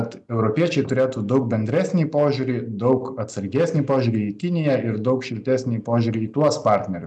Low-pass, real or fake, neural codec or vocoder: 10.8 kHz; real; none